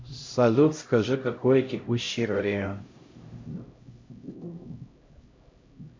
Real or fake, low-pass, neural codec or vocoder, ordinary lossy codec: fake; 7.2 kHz; codec, 16 kHz, 0.5 kbps, X-Codec, HuBERT features, trained on LibriSpeech; MP3, 48 kbps